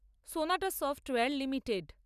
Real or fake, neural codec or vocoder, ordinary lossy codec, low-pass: real; none; none; 14.4 kHz